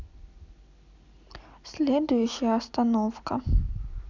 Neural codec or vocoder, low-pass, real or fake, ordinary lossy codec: none; 7.2 kHz; real; none